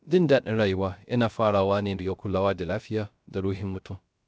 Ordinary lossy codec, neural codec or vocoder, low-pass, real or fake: none; codec, 16 kHz, 0.3 kbps, FocalCodec; none; fake